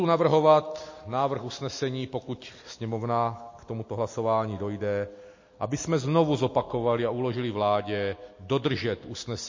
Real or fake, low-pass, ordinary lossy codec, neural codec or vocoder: real; 7.2 kHz; MP3, 32 kbps; none